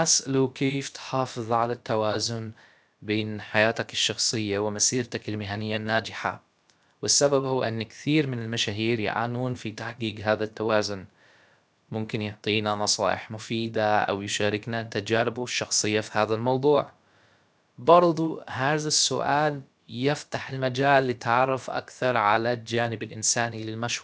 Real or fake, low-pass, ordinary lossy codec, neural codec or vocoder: fake; none; none; codec, 16 kHz, about 1 kbps, DyCAST, with the encoder's durations